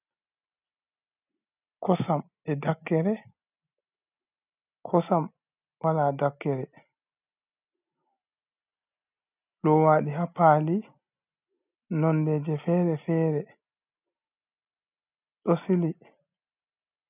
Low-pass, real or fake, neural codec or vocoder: 3.6 kHz; real; none